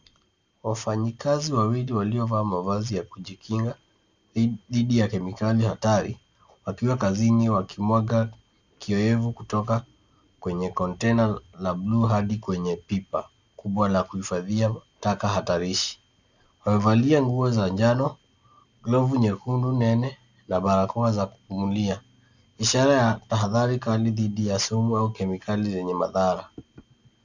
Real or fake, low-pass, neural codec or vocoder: real; 7.2 kHz; none